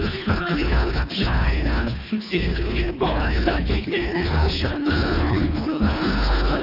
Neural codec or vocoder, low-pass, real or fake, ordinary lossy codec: codec, 24 kHz, 1.5 kbps, HILCodec; 5.4 kHz; fake; AAC, 32 kbps